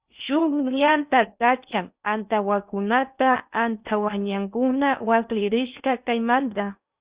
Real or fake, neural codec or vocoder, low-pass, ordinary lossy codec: fake; codec, 16 kHz in and 24 kHz out, 0.6 kbps, FocalCodec, streaming, 4096 codes; 3.6 kHz; Opus, 64 kbps